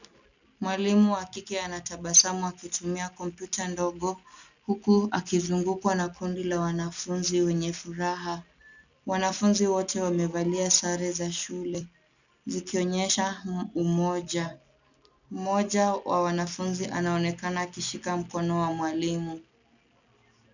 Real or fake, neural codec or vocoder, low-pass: real; none; 7.2 kHz